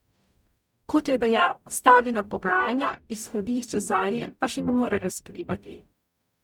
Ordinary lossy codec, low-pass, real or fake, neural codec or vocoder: none; 19.8 kHz; fake; codec, 44.1 kHz, 0.9 kbps, DAC